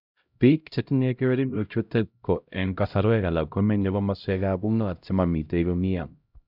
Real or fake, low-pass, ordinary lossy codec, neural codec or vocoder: fake; 5.4 kHz; none; codec, 16 kHz, 0.5 kbps, X-Codec, HuBERT features, trained on LibriSpeech